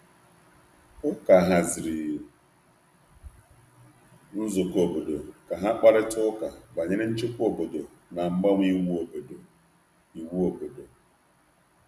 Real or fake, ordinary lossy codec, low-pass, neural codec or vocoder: real; none; 14.4 kHz; none